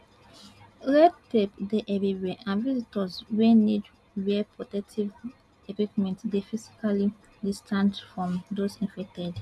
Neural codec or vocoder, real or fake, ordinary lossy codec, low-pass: none; real; none; none